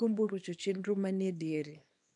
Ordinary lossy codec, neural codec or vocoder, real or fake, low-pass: none; codec, 24 kHz, 0.9 kbps, WavTokenizer, small release; fake; 10.8 kHz